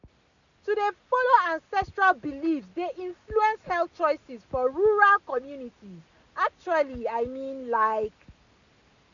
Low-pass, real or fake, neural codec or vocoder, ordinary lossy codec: 7.2 kHz; real; none; none